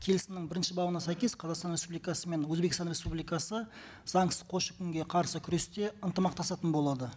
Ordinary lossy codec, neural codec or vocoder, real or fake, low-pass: none; none; real; none